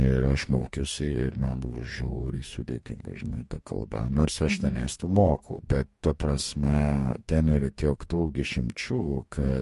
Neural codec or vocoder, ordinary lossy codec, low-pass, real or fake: codec, 44.1 kHz, 2.6 kbps, DAC; MP3, 48 kbps; 14.4 kHz; fake